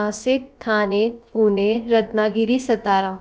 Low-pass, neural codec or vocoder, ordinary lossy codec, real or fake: none; codec, 16 kHz, about 1 kbps, DyCAST, with the encoder's durations; none; fake